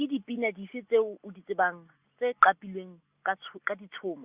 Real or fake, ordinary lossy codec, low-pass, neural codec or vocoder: real; Opus, 64 kbps; 3.6 kHz; none